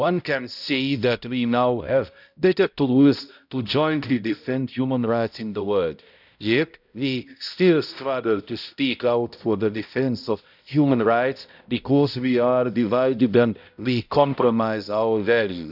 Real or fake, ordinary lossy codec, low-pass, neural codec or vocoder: fake; none; 5.4 kHz; codec, 16 kHz, 0.5 kbps, X-Codec, HuBERT features, trained on balanced general audio